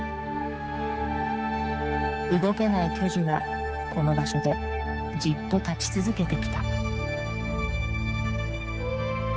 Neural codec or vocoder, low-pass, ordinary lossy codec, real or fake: codec, 16 kHz, 4 kbps, X-Codec, HuBERT features, trained on balanced general audio; none; none; fake